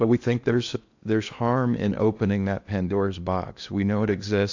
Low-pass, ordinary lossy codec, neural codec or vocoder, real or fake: 7.2 kHz; AAC, 48 kbps; codec, 16 kHz in and 24 kHz out, 0.8 kbps, FocalCodec, streaming, 65536 codes; fake